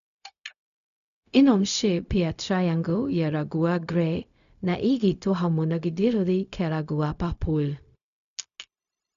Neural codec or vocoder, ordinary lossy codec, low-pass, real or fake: codec, 16 kHz, 0.4 kbps, LongCat-Audio-Codec; none; 7.2 kHz; fake